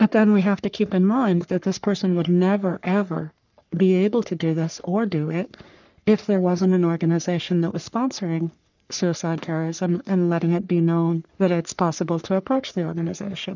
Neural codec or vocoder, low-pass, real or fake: codec, 44.1 kHz, 3.4 kbps, Pupu-Codec; 7.2 kHz; fake